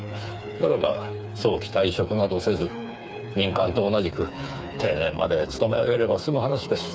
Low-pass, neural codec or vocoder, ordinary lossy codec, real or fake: none; codec, 16 kHz, 4 kbps, FreqCodec, smaller model; none; fake